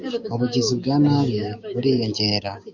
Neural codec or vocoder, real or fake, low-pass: codec, 16 kHz, 6 kbps, DAC; fake; 7.2 kHz